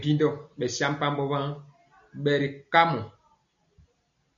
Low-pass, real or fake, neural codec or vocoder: 7.2 kHz; real; none